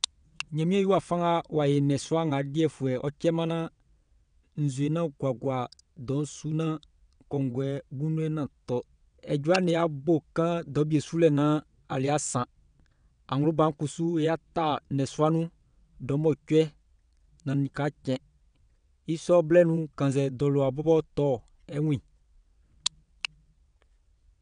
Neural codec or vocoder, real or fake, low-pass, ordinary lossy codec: vocoder, 22.05 kHz, 80 mel bands, WaveNeXt; fake; 9.9 kHz; none